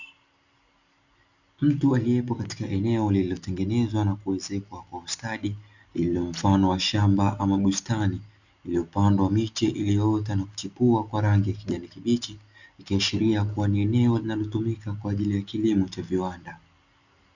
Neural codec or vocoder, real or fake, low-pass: none; real; 7.2 kHz